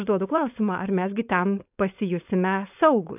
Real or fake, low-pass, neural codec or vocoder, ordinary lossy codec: fake; 3.6 kHz; codec, 16 kHz, 4.8 kbps, FACodec; AAC, 32 kbps